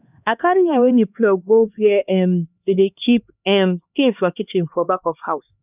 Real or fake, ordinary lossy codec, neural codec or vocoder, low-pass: fake; none; codec, 16 kHz, 2 kbps, X-Codec, HuBERT features, trained on LibriSpeech; 3.6 kHz